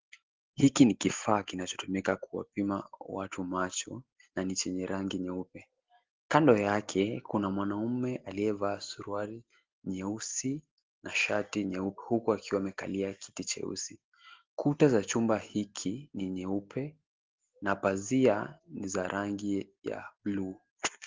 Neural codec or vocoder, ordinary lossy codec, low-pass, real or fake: none; Opus, 16 kbps; 7.2 kHz; real